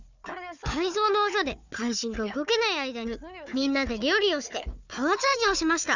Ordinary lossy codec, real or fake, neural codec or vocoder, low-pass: none; fake; codec, 16 kHz, 4 kbps, FunCodec, trained on Chinese and English, 50 frames a second; 7.2 kHz